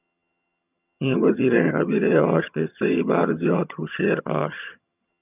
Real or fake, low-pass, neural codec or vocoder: fake; 3.6 kHz; vocoder, 22.05 kHz, 80 mel bands, HiFi-GAN